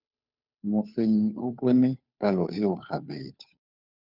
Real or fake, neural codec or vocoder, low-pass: fake; codec, 16 kHz, 2 kbps, FunCodec, trained on Chinese and English, 25 frames a second; 5.4 kHz